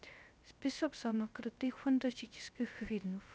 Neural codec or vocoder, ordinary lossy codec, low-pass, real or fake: codec, 16 kHz, 0.3 kbps, FocalCodec; none; none; fake